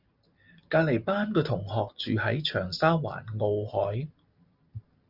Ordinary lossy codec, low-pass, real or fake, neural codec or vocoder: Opus, 64 kbps; 5.4 kHz; real; none